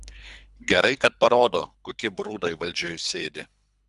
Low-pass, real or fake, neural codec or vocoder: 10.8 kHz; fake; codec, 24 kHz, 3 kbps, HILCodec